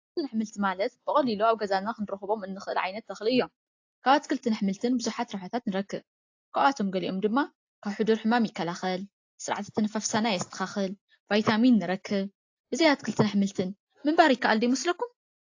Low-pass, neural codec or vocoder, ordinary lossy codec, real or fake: 7.2 kHz; none; AAC, 48 kbps; real